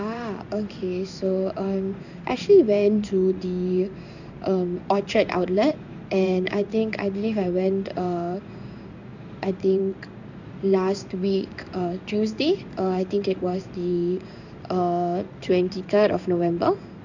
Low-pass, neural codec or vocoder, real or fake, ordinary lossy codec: 7.2 kHz; codec, 16 kHz in and 24 kHz out, 1 kbps, XY-Tokenizer; fake; none